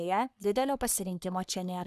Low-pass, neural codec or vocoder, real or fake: 14.4 kHz; codec, 44.1 kHz, 3.4 kbps, Pupu-Codec; fake